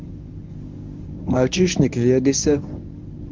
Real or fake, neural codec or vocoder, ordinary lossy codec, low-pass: fake; codec, 24 kHz, 0.9 kbps, WavTokenizer, medium speech release version 1; Opus, 32 kbps; 7.2 kHz